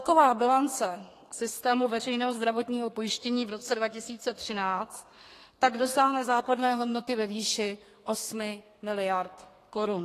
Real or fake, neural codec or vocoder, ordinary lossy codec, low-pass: fake; codec, 32 kHz, 1.9 kbps, SNAC; AAC, 48 kbps; 14.4 kHz